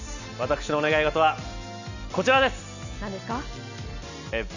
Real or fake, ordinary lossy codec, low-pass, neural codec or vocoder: real; none; 7.2 kHz; none